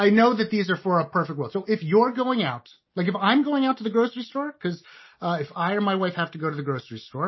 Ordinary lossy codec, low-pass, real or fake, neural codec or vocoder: MP3, 24 kbps; 7.2 kHz; real; none